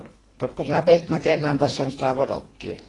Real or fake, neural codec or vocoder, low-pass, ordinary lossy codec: fake; codec, 24 kHz, 1.5 kbps, HILCodec; 10.8 kHz; AAC, 32 kbps